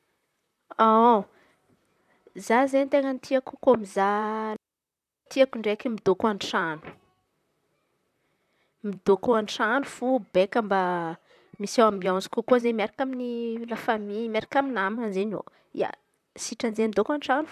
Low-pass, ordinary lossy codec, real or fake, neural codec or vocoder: 14.4 kHz; none; fake; vocoder, 44.1 kHz, 128 mel bands, Pupu-Vocoder